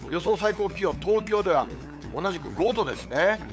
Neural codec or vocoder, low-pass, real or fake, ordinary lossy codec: codec, 16 kHz, 8 kbps, FunCodec, trained on LibriTTS, 25 frames a second; none; fake; none